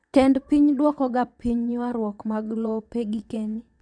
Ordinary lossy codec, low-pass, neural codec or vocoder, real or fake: none; 9.9 kHz; vocoder, 22.05 kHz, 80 mel bands, WaveNeXt; fake